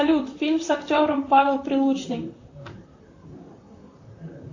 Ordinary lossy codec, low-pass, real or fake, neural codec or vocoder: AAC, 48 kbps; 7.2 kHz; fake; vocoder, 44.1 kHz, 128 mel bands, Pupu-Vocoder